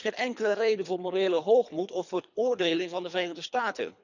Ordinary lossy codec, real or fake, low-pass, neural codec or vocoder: none; fake; 7.2 kHz; codec, 24 kHz, 3 kbps, HILCodec